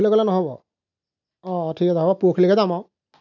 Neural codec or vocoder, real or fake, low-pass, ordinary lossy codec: none; real; 7.2 kHz; none